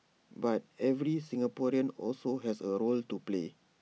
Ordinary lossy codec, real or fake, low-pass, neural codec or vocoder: none; real; none; none